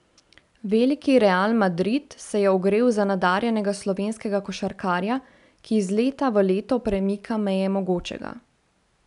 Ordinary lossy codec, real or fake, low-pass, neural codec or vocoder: none; real; 10.8 kHz; none